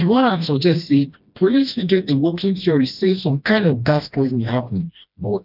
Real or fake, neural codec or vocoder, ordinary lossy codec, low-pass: fake; codec, 16 kHz, 1 kbps, FreqCodec, smaller model; none; 5.4 kHz